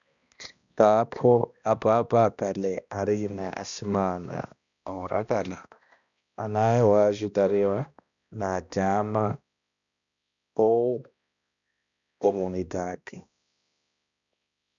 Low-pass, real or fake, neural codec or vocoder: 7.2 kHz; fake; codec, 16 kHz, 1 kbps, X-Codec, HuBERT features, trained on balanced general audio